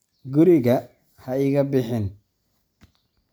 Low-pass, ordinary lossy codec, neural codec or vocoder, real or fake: none; none; none; real